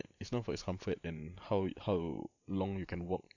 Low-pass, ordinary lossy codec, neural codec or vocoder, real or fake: 7.2 kHz; none; vocoder, 44.1 kHz, 128 mel bands every 512 samples, BigVGAN v2; fake